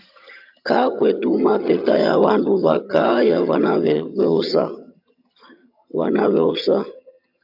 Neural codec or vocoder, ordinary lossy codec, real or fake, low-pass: vocoder, 22.05 kHz, 80 mel bands, HiFi-GAN; AAC, 48 kbps; fake; 5.4 kHz